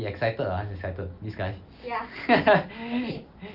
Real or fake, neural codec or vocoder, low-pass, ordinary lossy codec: real; none; 5.4 kHz; Opus, 24 kbps